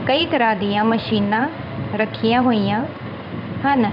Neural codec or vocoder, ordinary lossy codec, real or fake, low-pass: codec, 16 kHz in and 24 kHz out, 1 kbps, XY-Tokenizer; none; fake; 5.4 kHz